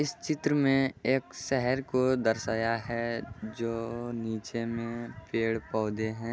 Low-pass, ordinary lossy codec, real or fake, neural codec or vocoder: none; none; real; none